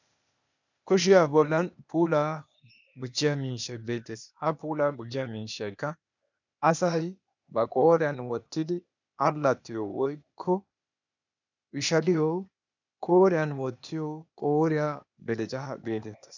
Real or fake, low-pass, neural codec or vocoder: fake; 7.2 kHz; codec, 16 kHz, 0.8 kbps, ZipCodec